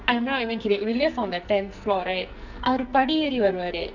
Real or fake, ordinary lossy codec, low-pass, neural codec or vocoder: fake; none; 7.2 kHz; codec, 44.1 kHz, 2.6 kbps, SNAC